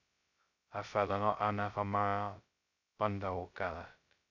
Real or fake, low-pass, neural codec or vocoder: fake; 7.2 kHz; codec, 16 kHz, 0.2 kbps, FocalCodec